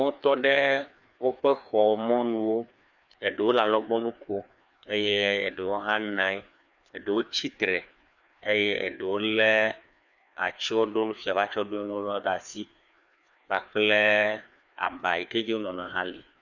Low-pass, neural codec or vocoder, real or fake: 7.2 kHz; codec, 16 kHz, 2 kbps, FreqCodec, larger model; fake